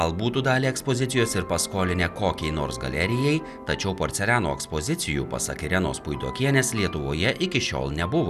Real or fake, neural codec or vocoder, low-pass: real; none; 14.4 kHz